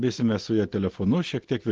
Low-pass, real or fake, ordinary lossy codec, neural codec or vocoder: 7.2 kHz; real; Opus, 16 kbps; none